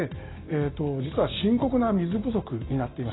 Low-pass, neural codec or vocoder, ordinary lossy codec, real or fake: 7.2 kHz; none; AAC, 16 kbps; real